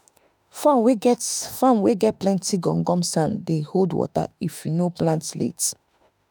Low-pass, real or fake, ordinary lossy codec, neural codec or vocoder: none; fake; none; autoencoder, 48 kHz, 32 numbers a frame, DAC-VAE, trained on Japanese speech